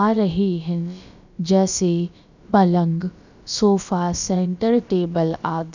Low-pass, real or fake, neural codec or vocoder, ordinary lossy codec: 7.2 kHz; fake; codec, 16 kHz, about 1 kbps, DyCAST, with the encoder's durations; none